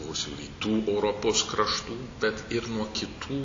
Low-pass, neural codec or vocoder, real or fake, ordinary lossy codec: 7.2 kHz; none; real; AAC, 48 kbps